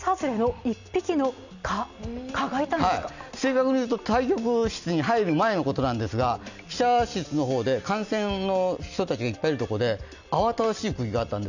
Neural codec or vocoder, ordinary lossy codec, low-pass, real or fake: none; none; 7.2 kHz; real